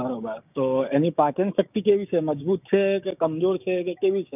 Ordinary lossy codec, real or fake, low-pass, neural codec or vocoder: none; fake; 3.6 kHz; codec, 16 kHz, 6 kbps, DAC